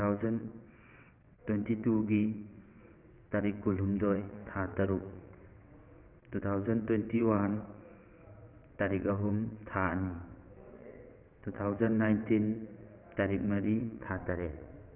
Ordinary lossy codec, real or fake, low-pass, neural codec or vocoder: none; fake; 3.6 kHz; vocoder, 22.05 kHz, 80 mel bands, WaveNeXt